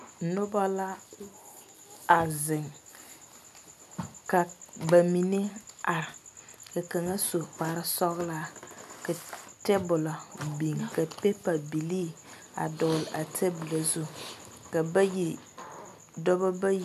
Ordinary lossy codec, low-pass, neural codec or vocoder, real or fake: MP3, 96 kbps; 14.4 kHz; none; real